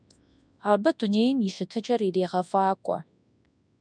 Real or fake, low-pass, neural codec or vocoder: fake; 9.9 kHz; codec, 24 kHz, 0.9 kbps, WavTokenizer, large speech release